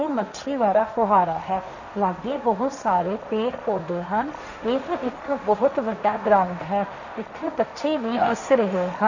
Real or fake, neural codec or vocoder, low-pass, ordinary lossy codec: fake; codec, 16 kHz, 1.1 kbps, Voila-Tokenizer; 7.2 kHz; none